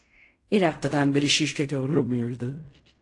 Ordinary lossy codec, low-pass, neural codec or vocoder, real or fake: AAC, 48 kbps; 10.8 kHz; codec, 16 kHz in and 24 kHz out, 0.4 kbps, LongCat-Audio-Codec, fine tuned four codebook decoder; fake